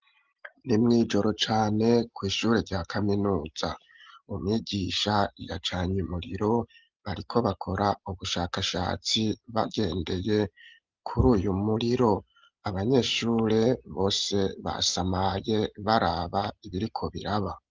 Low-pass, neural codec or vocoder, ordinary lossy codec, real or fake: 7.2 kHz; none; Opus, 32 kbps; real